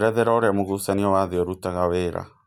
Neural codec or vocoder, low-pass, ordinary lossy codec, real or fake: none; 19.8 kHz; none; real